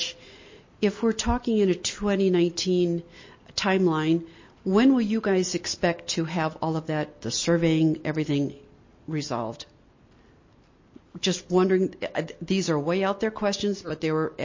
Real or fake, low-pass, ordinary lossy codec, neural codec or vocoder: real; 7.2 kHz; MP3, 32 kbps; none